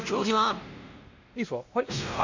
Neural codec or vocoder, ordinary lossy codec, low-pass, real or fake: codec, 16 kHz, 1 kbps, X-Codec, WavLM features, trained on Multilingual LibriSpeech; Opus, 64 kbps; 7.2 kHz; fake